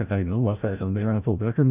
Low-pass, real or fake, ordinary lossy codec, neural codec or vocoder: 3.6 kHz; fake; none; codec, 16 kHz, 0.5 kbps, FreqCodec, larger model